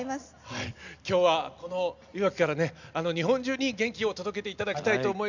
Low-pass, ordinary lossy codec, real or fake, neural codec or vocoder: 7.2 kHz; none; real; none